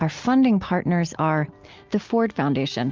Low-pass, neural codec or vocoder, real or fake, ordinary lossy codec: 7.2 kHz; none; real; Opus, 24 kbps